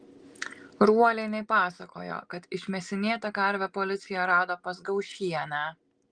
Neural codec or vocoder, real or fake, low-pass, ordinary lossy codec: none; real; 9.9 kHz; Opus, 24 kbps